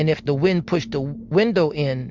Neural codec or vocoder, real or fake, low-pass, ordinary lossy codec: none; real; 7.2 kHz; MP3, 64 kbps